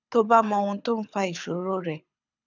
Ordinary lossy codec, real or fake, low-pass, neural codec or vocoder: none; fake; 7.2 kHz; codec, 24 kHz, 6 kbps, HILCodec